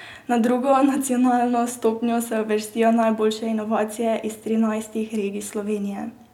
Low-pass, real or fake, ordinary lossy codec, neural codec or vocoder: 19.8 kHz; real; none; none